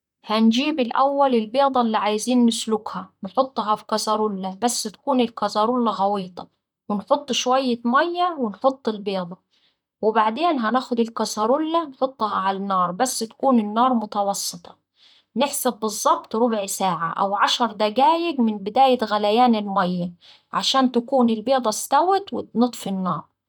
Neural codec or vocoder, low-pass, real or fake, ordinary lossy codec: vocoder, 44.1 kHz, 128 mel bands, Pupu-Vocoder; 19.8 kHz; fake; none